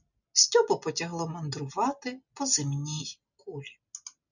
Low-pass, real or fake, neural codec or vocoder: 7.2 kHz; real; none